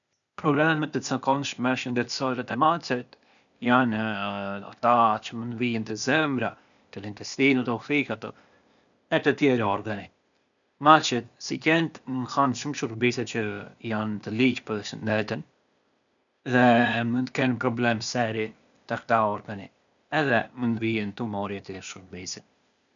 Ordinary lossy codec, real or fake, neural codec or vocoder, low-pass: none; fake; codec, 16 kHz, 0.8 kbps, ZipCodec; 7.2 kHz